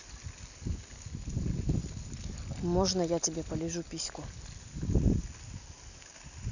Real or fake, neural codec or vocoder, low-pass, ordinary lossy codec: real; none; 7.2 kHz; none